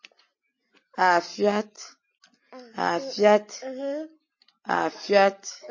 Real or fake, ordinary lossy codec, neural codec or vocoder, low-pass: real; MP3, 32 kbps; none; 7.2 kHz